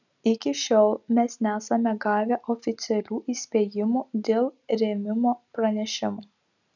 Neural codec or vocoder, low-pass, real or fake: none; 7.2 kHz; real